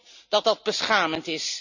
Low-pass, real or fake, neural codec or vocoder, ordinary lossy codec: 7.2 kHz; real; none; none